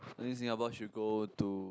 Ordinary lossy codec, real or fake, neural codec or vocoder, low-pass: none; real; none; none